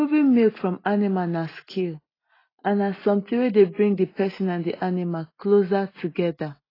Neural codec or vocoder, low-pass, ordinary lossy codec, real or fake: none; 5.4 kHz; AAC, 24 kbps; real